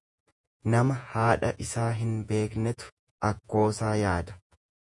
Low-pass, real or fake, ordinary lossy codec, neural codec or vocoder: 10.8 kHz; fake; MP3, 96 kbps; vocoder, 48 kHz, 128 mel bands, Vocos